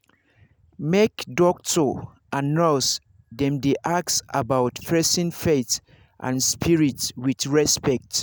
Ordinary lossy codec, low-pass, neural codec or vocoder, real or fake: none; none; none; real